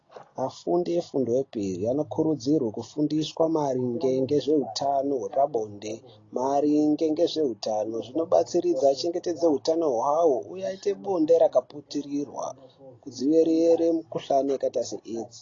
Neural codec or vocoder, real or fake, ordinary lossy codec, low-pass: none; real; AAC, 32 kbps; 7.2 kHz